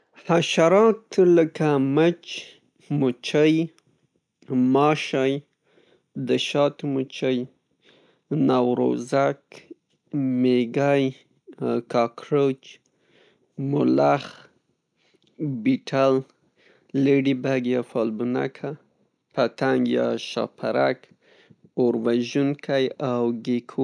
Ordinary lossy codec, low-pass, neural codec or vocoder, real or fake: none; 9.9 kHz; none; real